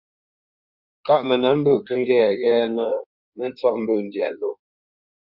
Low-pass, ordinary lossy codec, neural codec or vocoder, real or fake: 5.4 kHz; Opus, 64 kbps; codec, 16 kHz in and 24 kHz out, 1.1 kbps, FireRedTTS-2 codec; fake